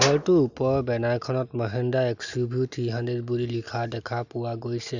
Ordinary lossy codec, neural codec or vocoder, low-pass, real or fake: none; none; 7.2 kHz; real